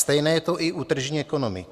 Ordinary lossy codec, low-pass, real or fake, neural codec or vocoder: Opus, 64 kbps; 14.4 kHz; real; none